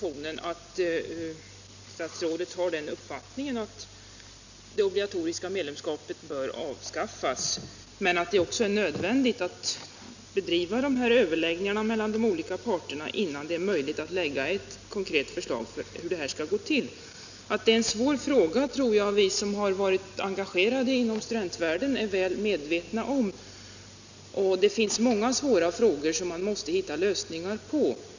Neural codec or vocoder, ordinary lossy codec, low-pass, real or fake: none; none; 7.2 kHz; real